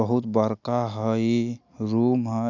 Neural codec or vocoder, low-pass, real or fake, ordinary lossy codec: none; 7.2 kHz; real; none